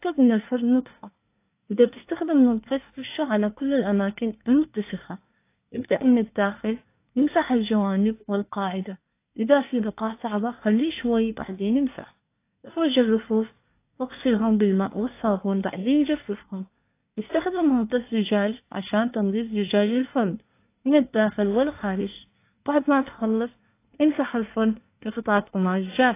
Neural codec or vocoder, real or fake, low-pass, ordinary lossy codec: codec, 24 kHz, 1 kbps, SNAC; fake; 3.6 kHz; AAC, 24 kbps